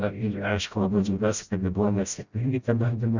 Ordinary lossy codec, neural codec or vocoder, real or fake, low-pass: Opus, 64 kbps; codec, 16 kHz, 0.5 kbps, FreqCodec, smaller model; fake; 7.2 kHz